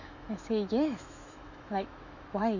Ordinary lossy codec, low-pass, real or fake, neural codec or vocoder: none; 7.2 kHz; fake; autoencoder, 48 kHz, 128 numbers a frame, DAC-VAE, trained on Japanese speech